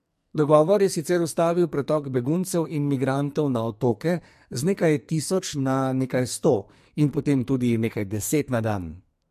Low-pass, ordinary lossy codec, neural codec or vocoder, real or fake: 14.4 kHz; MP3, 64 kbps; codec, 44.1 kHz, 2.6 kbps, SNAC; fake